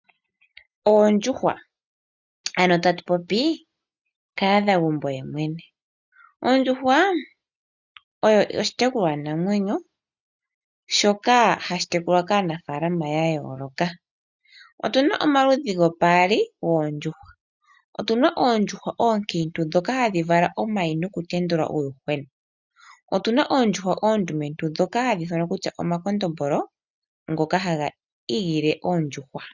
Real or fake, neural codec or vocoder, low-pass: real; none; 7.2 kHz